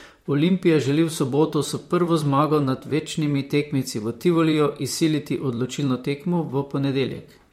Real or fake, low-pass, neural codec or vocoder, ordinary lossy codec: fake; 19.8 kHz; vocoder, 44.1 kHz, 128 mel bands, Pupu-Vocoder; MP3, 64 kbps